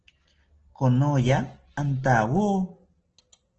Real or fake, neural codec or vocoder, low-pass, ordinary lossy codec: real; none; 7.2 kHz; Opus, 16 kbps